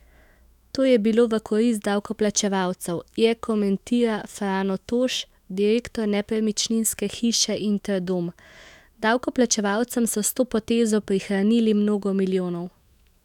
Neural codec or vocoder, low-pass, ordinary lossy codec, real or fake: autoencoder, 48 kHz, 128 numbers a frame, DAC-VAE, trained on Japanese speech; 19.8 kHz; none; fake